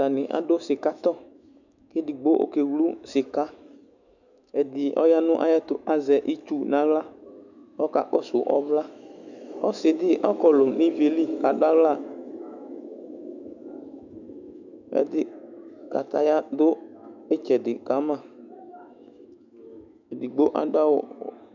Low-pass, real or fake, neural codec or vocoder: 7.2 kHz; fake; autoencoder, 48 kHz, 128 numbers a frame, DAC-VAE, trained on Japanese speech